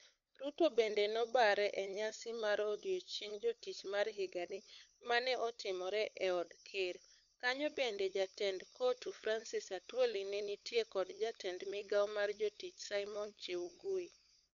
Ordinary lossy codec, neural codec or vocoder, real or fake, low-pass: none; codec, 16 kHz, 8 kbps, FunCodec, trained on LibriTTS, 25 frames a second; fake; 7.2 kHz